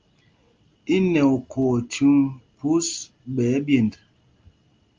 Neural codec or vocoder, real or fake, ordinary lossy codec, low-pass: none; real; Opus, 32 kbps; 7.2 kHz